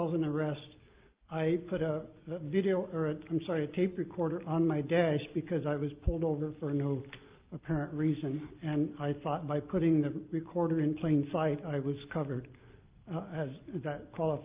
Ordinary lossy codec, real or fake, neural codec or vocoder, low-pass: Opus, 24 kbps; real; none; 3.6 kHz